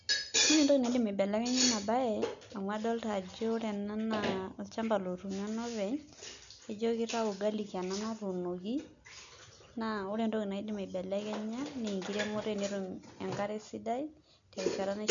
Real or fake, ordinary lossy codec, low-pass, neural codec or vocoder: real; none; 7.2 kHz; none